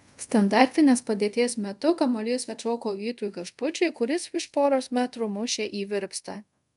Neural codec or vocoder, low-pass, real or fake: codec, 24 kHz, 0.5 kbps, DualCodec; 10.8 kHz; fake